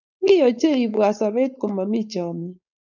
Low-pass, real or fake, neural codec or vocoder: 7.2 kHz; fake; codec, 16 kHz, 4.8 kbps, FACodec